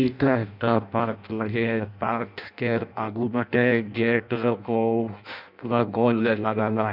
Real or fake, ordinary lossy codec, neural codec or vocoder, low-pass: fake; none; codec, 16 kHz in and 24 kHz out, 0.6 kbps, FireRedTTS-2 codec; 5.4 kHz